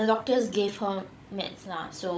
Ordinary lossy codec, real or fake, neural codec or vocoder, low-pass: none; fake; codec, 16 kHz, 16 kbps, FunCodec, trained on Chinese and English, 50 frames a second; none